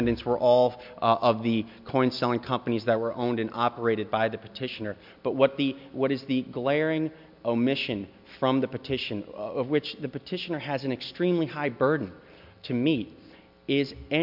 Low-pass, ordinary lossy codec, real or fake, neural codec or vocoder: 5.4 kHz; MP3, 48 kbps; real; none